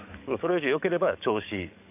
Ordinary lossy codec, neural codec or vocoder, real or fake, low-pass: none; codec, 44.1 kHz, 7.8 kbps, DAC; fake; 3.6 kHz